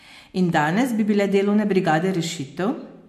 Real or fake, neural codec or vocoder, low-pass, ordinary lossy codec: real; none; 14.4 kHz; MP3, 64 kbps